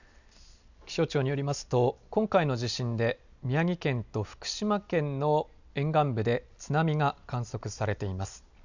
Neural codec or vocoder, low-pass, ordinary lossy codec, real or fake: none; 7.2 kHz; none; real